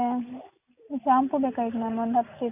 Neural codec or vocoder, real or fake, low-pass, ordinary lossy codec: none; real; 3.6 kHz; none